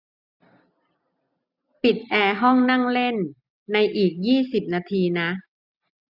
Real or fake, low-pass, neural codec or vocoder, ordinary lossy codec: real; 5.4 kHz; none; none